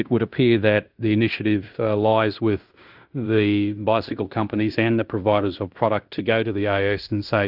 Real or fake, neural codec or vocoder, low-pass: fake; codec, 16 kHz in and 24 kHz out, 0.9 kbps, LongCat-Audio-Codec, fine tuned four codebook decoder; 5.4 kHz